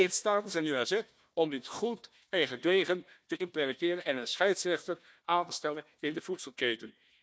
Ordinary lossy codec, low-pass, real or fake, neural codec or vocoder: none; none; fake; codec, 16 kHz, 1 kbps, FunCodec, trained on Chinese and English, 50 frames a second